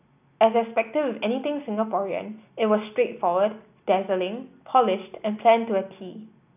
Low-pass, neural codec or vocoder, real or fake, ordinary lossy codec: 3.6 kHz; none; real; none